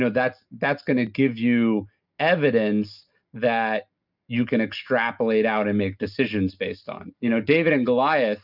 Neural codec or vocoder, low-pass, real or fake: none; 5.4 kHz; real